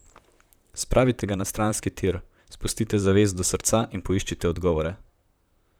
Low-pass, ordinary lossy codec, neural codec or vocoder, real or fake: none; none; vocoder, 44.1 kHz, 128 mel bands, Pupu-Vocoder; fake